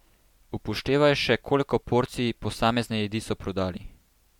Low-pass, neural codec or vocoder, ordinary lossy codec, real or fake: 19.8 kHz; none; MP3, 96 kbps; real